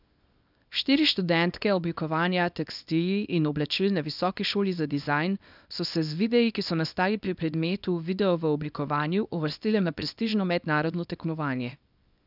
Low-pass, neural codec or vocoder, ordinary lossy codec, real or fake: 5.4 kHz; codec, 24 kHz, 0.9 kbps, WavTokenizer, small release; none; fake